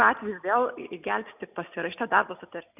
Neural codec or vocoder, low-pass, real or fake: none; 3.6 kHz; real